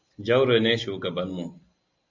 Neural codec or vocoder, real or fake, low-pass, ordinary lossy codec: none; real; 7.2 kHz; MP3, 64 kbps